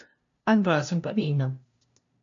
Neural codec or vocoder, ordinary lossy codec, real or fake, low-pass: codec, 16 kHz, 0.5 kbps, FunCodec, trained on LibriTTS, 25 frames a second; MP3, 64 kbps; fake; 7.2 kHz